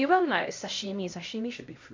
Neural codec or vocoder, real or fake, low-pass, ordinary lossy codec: codec, 16 kHz, 1 kbps, X-Codec, HuBERT features, trained on LibriSpeech; fake; 7.2 kHz; MP3, 64 kbps